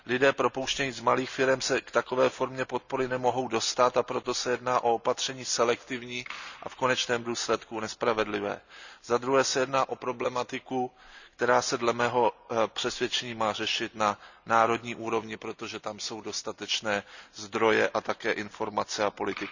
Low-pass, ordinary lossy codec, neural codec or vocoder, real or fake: 7.2 kHz; none; none; real